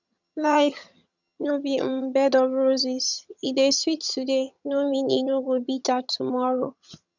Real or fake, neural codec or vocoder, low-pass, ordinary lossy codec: fake; vocoder, 22.05 kHz, 80 mel bands, HiFi-GAN; 7.2 kHz; none